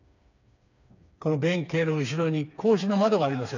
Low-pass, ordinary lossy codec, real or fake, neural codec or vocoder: 7.2 kHz; none; fake; codec, 16 kHz, 4 kbps, FreqCodec, smaller model